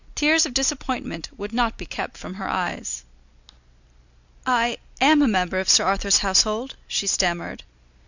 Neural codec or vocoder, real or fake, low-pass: none; real; 7.2 kHz